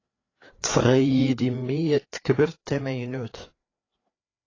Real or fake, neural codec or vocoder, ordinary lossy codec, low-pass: fake; codec, 16 kHz, 4 kbps, FreqCodec, larger model; AAC, 32 kbps; 7.2 kHz